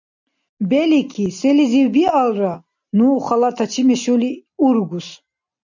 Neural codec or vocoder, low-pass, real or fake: none; 7.2 kHz; real